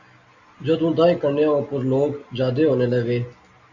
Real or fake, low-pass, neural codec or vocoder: real; 7.2 kHz; none